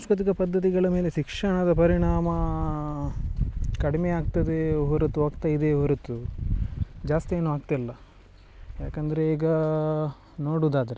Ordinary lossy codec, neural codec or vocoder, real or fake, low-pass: none; none; real; none